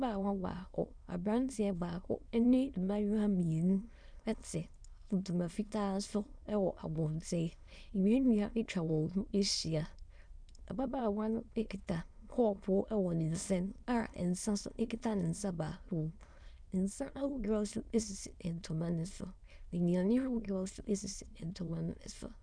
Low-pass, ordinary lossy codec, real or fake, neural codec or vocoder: 9.9 kHz; Opus, 64 kbps; fake; autoencoder, 22.05 kHz, a latent of 192 numbers a frame, VITS, trained on many speakers